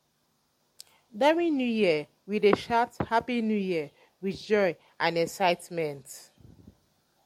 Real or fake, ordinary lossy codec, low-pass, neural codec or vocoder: real; MP3, 64 kbps; 19.8 kHz; none